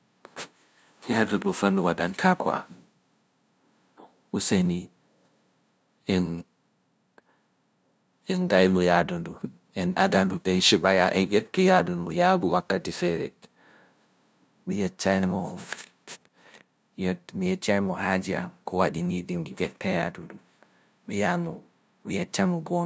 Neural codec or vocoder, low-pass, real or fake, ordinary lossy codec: codec, 16 kHz, 0.5 kbps, FunCodec, trained on LibriTTS, 25 frames a second; none; fake; none